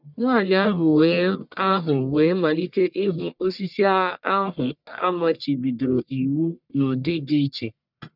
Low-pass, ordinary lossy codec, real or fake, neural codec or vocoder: 5.4 kHz; none; fake; codec, 44.1 kHz, 1.7 kbps, Pupu-Codec